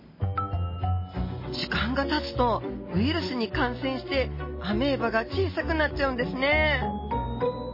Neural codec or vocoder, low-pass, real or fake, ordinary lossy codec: none; 5.4 kHz; real; MP3, 24 kbps